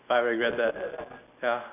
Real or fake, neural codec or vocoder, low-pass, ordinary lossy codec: real; none; 3.6 kHz; none